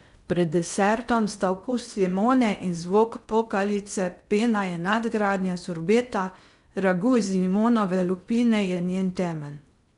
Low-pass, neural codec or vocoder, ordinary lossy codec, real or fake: 10.8 kHz; codec, 16 kHz in and 24 kHz out, 0.6 kbps, FocalCodec, streaming, 4096 codes; none; fake